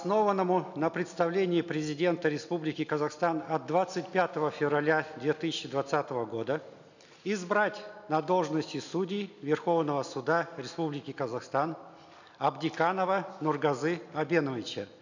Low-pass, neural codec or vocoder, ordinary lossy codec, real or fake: 7.2 kHz; none; none; real